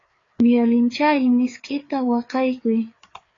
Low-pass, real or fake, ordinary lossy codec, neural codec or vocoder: 7.2 kHz; fake; AAC, 32 kbps; codec, 16 kHz, 4 kbps, FreqCodec, larger model